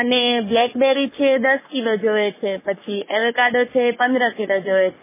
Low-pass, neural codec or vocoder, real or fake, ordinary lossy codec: 3.6 kHz; codec, 44.1 kHz, 3.4 kbps, Pupu-Codec; fake; MP3, 16 kbps